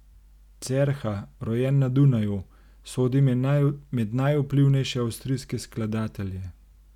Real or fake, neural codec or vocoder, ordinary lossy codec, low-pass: real; none; none; 19.8 kHz